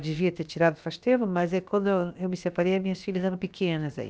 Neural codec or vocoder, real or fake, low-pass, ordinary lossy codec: codec, 16 kHz, about 1 kbps, DyCAST, with the encoder's durations; fake; none; none